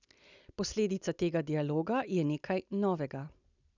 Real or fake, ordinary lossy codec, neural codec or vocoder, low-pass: real; none; none; 7.2 kHz